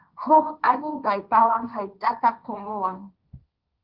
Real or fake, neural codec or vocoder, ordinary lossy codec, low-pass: fake; codec, 16 kHz, 1.1 kbps, Voila-Tokenizer; Opus, 32 kbps; 5.4 kHz